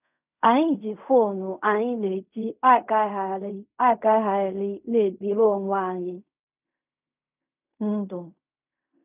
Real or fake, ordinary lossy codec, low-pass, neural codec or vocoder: fake; none; 3.6 kHz; codec, 16 kHz in and 24 kHz out, 0.4 kbps, LongCat-Audio-Codec, fine tuned four codebook decoder